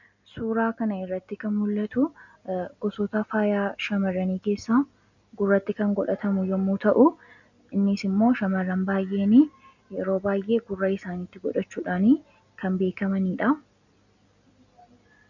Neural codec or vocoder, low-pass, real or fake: none; 7.2 kHz; real